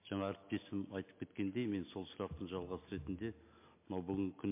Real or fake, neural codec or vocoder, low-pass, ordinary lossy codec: real; none; 3.6 kHz; MP3, 24 kbps